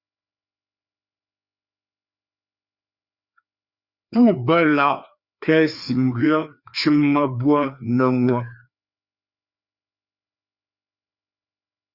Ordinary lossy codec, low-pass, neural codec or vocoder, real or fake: Opus, 64 kbps; 5.4 kHz; codec, 16 kHz, 2 kbps, FreqCodec, larger model; fake